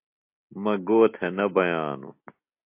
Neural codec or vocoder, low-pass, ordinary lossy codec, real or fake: none; 3.6 kHz; MP3, 32 kbps; real